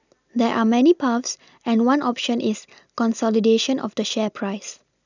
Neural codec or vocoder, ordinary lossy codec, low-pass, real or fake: none; none; 7.2 kHz; real